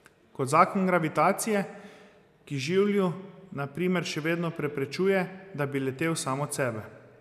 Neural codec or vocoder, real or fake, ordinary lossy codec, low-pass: none; real; none; 14.4 kHz